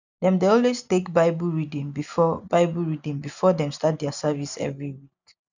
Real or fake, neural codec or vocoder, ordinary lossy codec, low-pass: real; none; none; 7.2 kHz